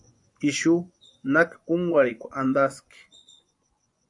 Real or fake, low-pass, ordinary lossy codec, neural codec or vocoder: fake; 10.8 kHz; AAC, 64 kbps; vocoder, 24 kHz, 100 mel bands, Vocos